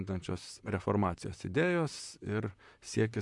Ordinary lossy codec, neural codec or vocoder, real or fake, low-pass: MP3, 64 kbps; vocoder, 44.1 kHz, 128 mel bands, Pupu-Vocoder; fake; 10.8 kHz